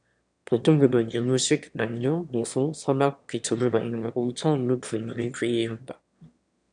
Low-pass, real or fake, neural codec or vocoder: 9.9 kHz; fake; autoencoder, 22.05 kHz, a latent of 192 numbers a frame, VITS, trained on one speaker